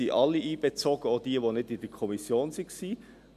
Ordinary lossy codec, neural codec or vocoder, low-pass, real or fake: none; none; 14.4 kHz; real